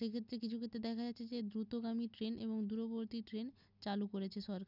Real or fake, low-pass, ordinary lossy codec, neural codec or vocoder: real; 5.4 kHz; none; none